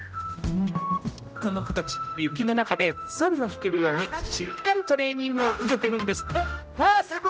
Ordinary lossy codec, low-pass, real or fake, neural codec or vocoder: none; none; fake; codec, 16 kHz, 0.5 kbps, X-Codec, HuBERT features, trained on general audio